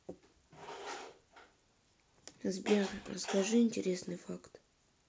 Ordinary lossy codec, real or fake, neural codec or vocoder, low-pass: none; real; none; none